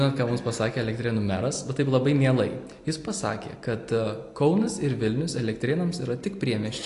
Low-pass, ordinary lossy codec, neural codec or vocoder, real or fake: 10.8 kHz; AAC, 48 kbps; none; real